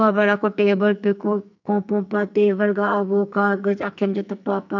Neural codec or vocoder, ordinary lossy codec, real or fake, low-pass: codec, 44.1 kHz, 2.6 kbps, SNAC; none; fake; 7.2 kHz